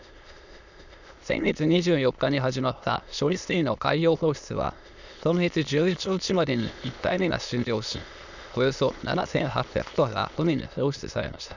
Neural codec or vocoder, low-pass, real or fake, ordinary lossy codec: autoencoder, 22.05 kHz, a latent of 192 numbers a frame, VITS, trained on many speakers; 7.2 kHz; fake; none